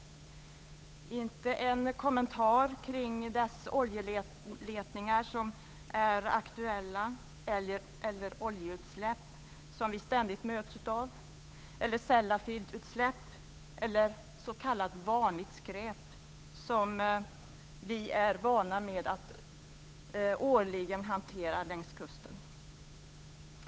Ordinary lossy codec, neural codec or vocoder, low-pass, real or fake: none; codec, 16 kHz, 8 kbps, FunCodec, trained on Chinese and English, 25 frames a second; none; fake